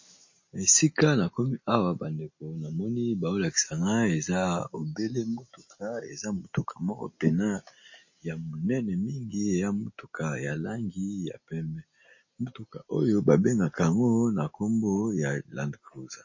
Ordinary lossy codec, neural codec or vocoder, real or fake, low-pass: MP3, 32 kbps; none; real; 7.2 kHz